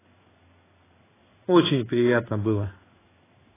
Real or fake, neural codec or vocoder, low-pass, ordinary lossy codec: fake; codec, 16 kHz in and 24 kHz out, 1 kbps, XY-Tokenizer; 3.6 kHz; AAC, 16 kbps